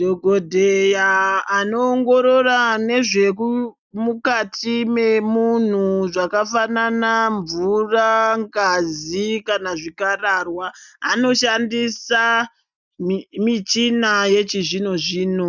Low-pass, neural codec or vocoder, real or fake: 7.2 kHz; none; real